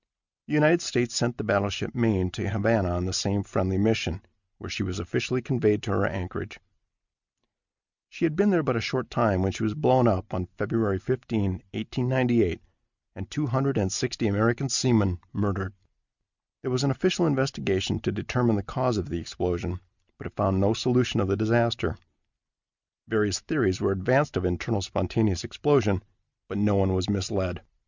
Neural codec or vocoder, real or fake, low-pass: none; real; 7.2 kHz